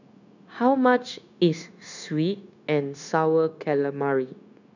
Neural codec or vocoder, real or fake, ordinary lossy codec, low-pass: codec, 16 kHz, 0.9 kbps, LongCat-Audio-Codec; fake; none; 7.2 kHz